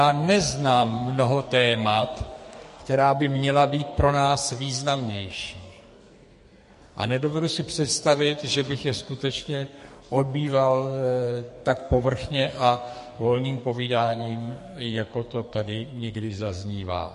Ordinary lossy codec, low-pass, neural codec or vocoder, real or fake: MP3, 48 kbps; 14.4 kHz; codec, 44.1 kHz, 2.6 kbps, SNAC; fake